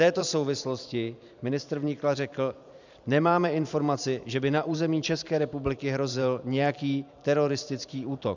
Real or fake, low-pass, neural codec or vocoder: fake; 7.2 kHz; vocoder, 44.1 kHz, 128 mel bands every 512 samples, BigVGAN v2